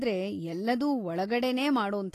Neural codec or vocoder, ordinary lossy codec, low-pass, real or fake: none; AAC, 48 kbps; 14.4 kHz; real